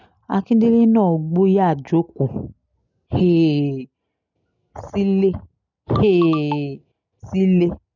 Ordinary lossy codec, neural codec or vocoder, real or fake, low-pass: none; none; real; 7.2 kHz